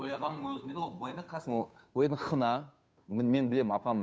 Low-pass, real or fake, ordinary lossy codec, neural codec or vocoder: none; fake; none; codec, 16 kHz, 2 kbps, FunCodec, trained on Chinese and English, 25 frames a second